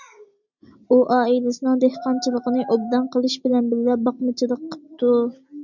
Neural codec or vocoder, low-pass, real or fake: none; 7.2 kHz; real